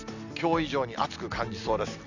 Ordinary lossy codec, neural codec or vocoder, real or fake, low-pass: none; none; real; 7.2 kHz